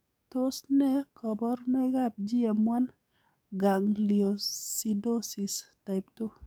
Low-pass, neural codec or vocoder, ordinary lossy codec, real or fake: none; codec, 44.1 kHz, 7.8 kbps, DAC; none; fake